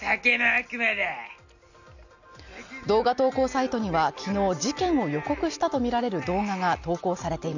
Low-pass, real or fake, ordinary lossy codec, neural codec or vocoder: 7.2 kHz; real; none; none